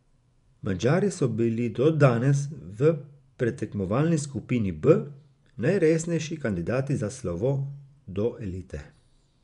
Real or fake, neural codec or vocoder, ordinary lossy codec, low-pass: fake; vocoder, 24 kHz, 100 mel bands, Vocos; none; 10.8 kHz